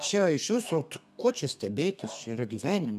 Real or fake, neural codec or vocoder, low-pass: fake; codec, 32 kHz, 1.9 kbps, SNAC; 14.4 kHz